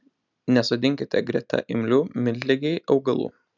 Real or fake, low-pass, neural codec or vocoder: real; 7.2 kHz; none